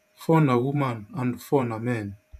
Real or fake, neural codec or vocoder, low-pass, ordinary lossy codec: fake; vocoder, 48 kHz, 128 mel bands, Vocos; 14.4 kHz; none